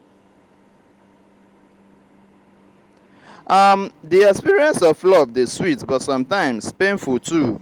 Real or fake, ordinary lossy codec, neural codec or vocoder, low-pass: real; Opus, 24 kbps; none; 19.8 kHz